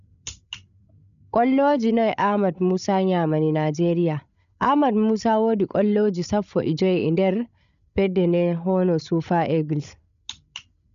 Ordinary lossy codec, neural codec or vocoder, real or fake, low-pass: none; codec, 16 kHz, 16 kbps, FreqCodec, larger model; fake; 7.2 kHz